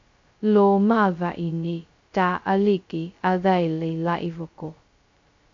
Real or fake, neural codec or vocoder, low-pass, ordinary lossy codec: fake; codec, 16 kHz, 0.2 kbps, FocalCodec; 7.2 kHz; MP3, 48 kbps